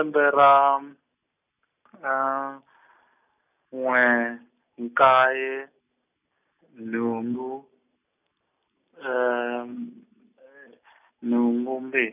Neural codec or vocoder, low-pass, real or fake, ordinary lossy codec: none; 3.6 kHz; real; none